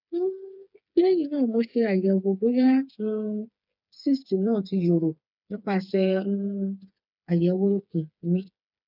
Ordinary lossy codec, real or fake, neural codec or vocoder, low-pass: none; fake; codec, 16 kHz, 4 kbps, FreqCodec, smaller model; 5.4 kHz